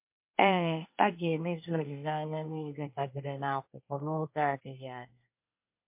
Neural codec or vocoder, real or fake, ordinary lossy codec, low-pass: codec, 24 kHz, 1 kbps, SNAC; fake; MP3, 32 kbps; 3.6 kHz